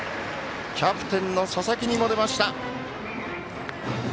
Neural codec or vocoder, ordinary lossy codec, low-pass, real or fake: none; none; none; real